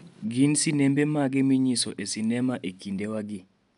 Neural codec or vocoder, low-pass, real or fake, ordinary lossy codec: none; 10.8 kHz; real; none